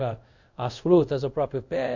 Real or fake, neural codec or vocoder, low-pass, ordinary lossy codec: fake; codec, 24 kHz, 0.5 kbps, DualCodec; 7.2 kHz; none